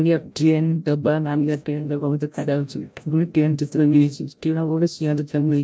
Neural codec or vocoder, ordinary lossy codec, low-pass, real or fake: codec, 16 kHz, 0.5 kbps, FreqCodec, larger model; none; none; fake